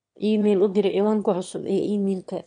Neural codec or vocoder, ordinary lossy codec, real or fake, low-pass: autoencoder, 22.05 kHz, a latent of 192 numbers a frame, VITS, trained on one speaker; MP3, 64 kbps; fake; 9.9 kHz